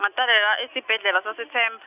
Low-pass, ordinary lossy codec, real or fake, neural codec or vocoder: 3.6 kHz; none; real; none